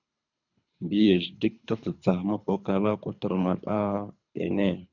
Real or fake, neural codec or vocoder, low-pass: fake; codec, 24 kHz, 3 kbps, HILCodec; 7.2 kHz